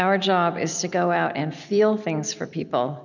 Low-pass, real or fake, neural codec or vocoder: 7.2 kHz; real; none